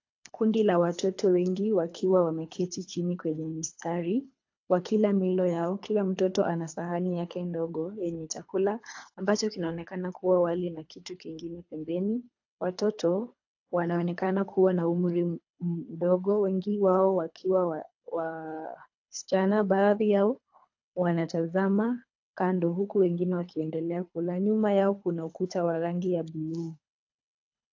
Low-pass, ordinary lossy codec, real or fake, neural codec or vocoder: 7.2 kHz; AAC, 48 kbps; fake; codec, 24 kHz, 3 kbps, HILCodec